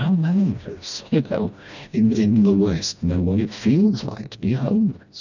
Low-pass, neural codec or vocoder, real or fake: 7.2 kHz; codec, 16 kHz, 1 kbps, FreqCodec, smaller model; fake